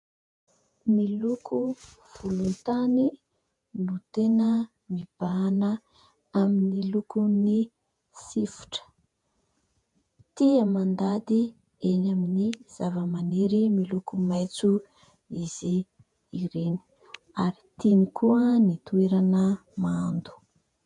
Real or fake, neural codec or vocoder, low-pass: fake; vocoder, 48 kHz, 128 mel bands, Vocos; 10.8 kHz